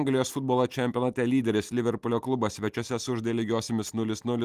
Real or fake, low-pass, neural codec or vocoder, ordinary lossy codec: real; 14.4 kHz; none; Opus, 24 kbps